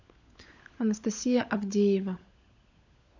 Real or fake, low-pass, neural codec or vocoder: fake; 7.2 kHz; codec, 16 kHz, 16 kbps, FunCodec, trained on LibriTTS, 50 frames a second